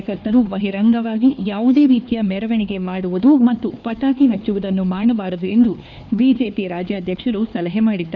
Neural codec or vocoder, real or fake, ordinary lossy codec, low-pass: codec, 16 kHz, 2 kbps, X-Codec, HuBERT features, trained on LibriSpeech; fake; none; 7.2 kHz